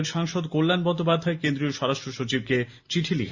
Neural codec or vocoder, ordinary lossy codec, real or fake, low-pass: none; Opus, 64 kbps; real; 7.2 kHz